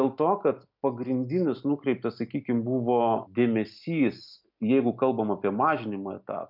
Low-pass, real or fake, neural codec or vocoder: 5.4 kHz; real; none